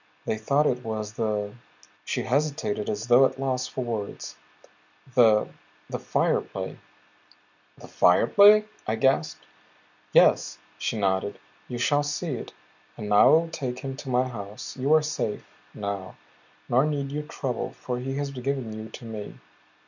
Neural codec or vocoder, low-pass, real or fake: none; 7.2 kHz; real